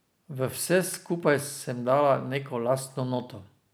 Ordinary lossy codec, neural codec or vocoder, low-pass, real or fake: none; none; none; real